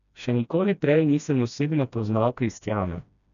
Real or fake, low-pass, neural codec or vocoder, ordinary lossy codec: fake; 7.2 kHz; codec, 16 kHz, 1 kbps, FreqCodec, smaller model; none